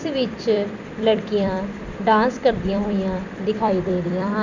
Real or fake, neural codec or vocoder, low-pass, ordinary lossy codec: fake; vocoder, 44.1 kHz, 128 mel bands every 256 samples, BigVGAN v2; 7.2 kHz; none